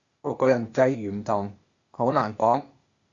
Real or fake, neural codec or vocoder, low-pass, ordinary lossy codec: fake; codec, 16 kHz, 0.8 kbps, ZipCodec; 7.2 kHz; Opus, 64 kbps